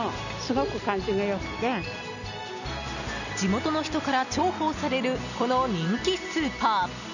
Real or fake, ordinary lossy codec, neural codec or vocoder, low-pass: real; none; none; 7.2 kHz